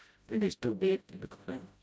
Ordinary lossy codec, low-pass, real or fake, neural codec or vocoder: none; none; fake; codec, 16 kHz, 0.5 kbps, FreqCodec, smaller model